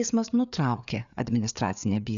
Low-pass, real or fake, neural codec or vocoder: 7.2 kHz; fake; codec, 16 kHz, 4 kbps, FreqCodec, larger model